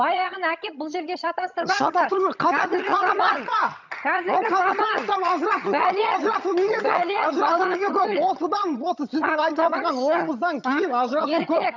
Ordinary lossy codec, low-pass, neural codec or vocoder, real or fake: none; 7.2 kHz; vocoder, 22.05 kHz, 80 mel bands, HiFi-GAN; fake